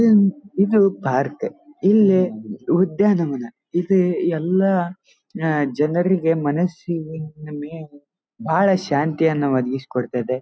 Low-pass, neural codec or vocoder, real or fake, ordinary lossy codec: none; none; real; none